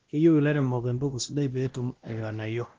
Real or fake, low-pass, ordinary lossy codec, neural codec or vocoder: fake; 7.2 kHz; Opus, 16 kbps; codec, 16 kHz, 1 kbps, X-Codec, WavLM features, trained on Multilingual LibriSpeech